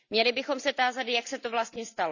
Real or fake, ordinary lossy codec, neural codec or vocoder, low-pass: real; none; none; 7.2 kHz